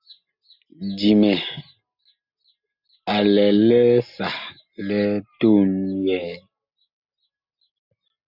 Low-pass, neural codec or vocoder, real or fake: 5.4 kHz; none; real